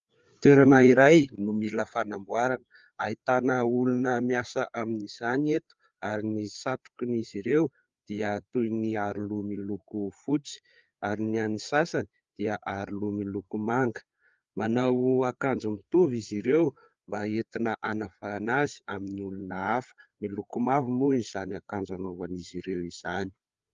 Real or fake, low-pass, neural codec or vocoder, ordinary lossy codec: fake; 7.2 kHz; codec, 16 kHz, 4 kbps, FreqCodec, larger model; Opus, 32 kbps